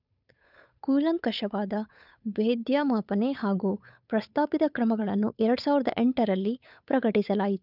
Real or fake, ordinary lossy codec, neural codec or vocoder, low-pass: fake; none; codec, 16 kHz, 8 kbps, FunCodec, trained on Chinese and English, 25 frames a second; 5.4 kHz